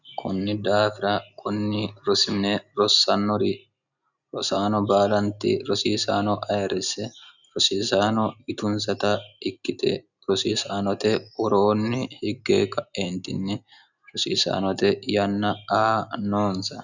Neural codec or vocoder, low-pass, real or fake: none; 7.2 kHz; real